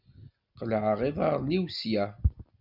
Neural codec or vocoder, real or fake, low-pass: none; real; 5.4 kHz